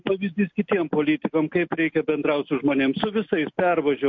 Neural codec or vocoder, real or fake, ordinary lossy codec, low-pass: none; real; MP3, 48 kbps; 7.2 kHz